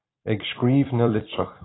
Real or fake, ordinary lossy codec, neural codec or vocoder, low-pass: fake; AAC, 16 kbps; vocoder, 44.1 kHz, 128 mel bands every 256 samples, BigVGAN v2; 7.2 kHz